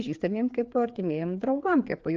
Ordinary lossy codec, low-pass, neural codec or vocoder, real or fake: Opus, 32 kbps; 7.2 kHz; codec, 16 kHz, 4 kbps, FreqCodec, larger model; fake